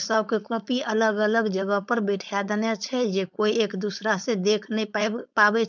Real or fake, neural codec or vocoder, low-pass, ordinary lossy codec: fake; codec, 16 kHz, 4.8 kbps, FACodec; 7.2 kHz; none